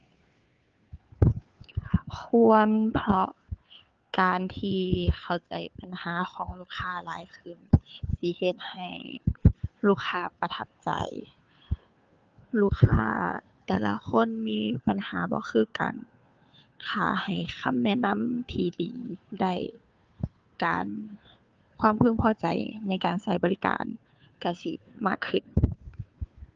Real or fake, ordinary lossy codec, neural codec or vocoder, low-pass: fake; Opus, 24 kbps; codec, 16 kHz, 2 kbps, FunCodec, trained on Chinese and English, 25 frames a second; 7.2 kHz